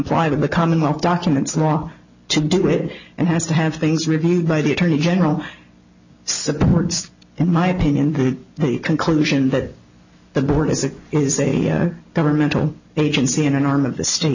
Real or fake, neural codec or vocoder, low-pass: real; none; 7.2 kHz